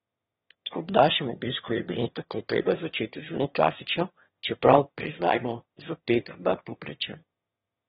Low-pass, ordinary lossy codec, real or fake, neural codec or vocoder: 9.9 kHz; AAC, 16 kbps; fake; autoencoder, 22.05 kHz, a latent of 192 numbers a frame, VITS, trained on one speaker